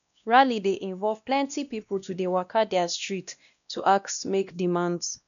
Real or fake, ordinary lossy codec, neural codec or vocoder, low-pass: fake; none; codec, 16 kHz, 1 kbps, X-Codec, WavLM features, trained on Multilingual LibriSpeech; 7.2 kHz